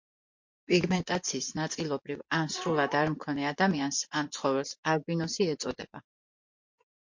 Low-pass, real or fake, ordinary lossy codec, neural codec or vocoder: 7.2 kHz; fake; MP3, 48 kbps; vocoder, 24 kHz, 100 mel bands, Vocos